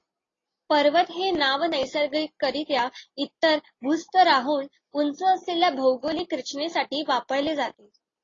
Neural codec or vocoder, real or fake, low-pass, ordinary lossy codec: none; real; 7.2 kHz; AAC, 32 kbps